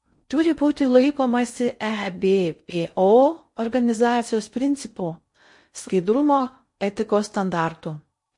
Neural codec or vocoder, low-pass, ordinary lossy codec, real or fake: codec, 16 kHz in and 24 kHz out, 0.6 kbps, FocalCodec, streaming, 2048 codes; 10.8 kHz; MP3, 48 kbps; fake